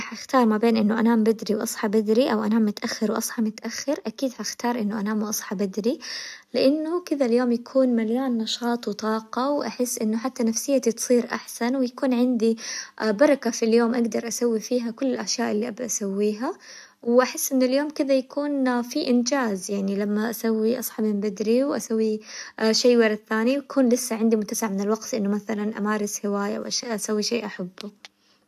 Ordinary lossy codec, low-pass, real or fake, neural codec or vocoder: AAC, 96 kbps; 14.4 kHz; real; none